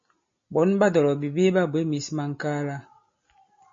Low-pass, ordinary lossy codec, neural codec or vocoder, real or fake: 7.2 kHz; MP3, 32 kbps; none; real